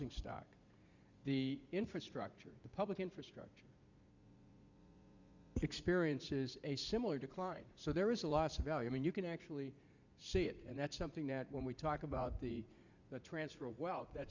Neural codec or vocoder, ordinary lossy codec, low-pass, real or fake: vocoder, 44.1 kHz, 80 mel bands, Vocos; Opus, 64 kbps; 7.2 kHz; fake